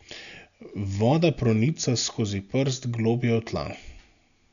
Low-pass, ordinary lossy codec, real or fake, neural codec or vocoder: 7.2 kHz; none; real; none